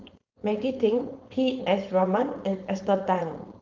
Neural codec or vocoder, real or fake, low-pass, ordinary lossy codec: codec, 16 kHz, 4.8 kbps, FACodec; fake; 7.2 kHz; Opus, 24 kbps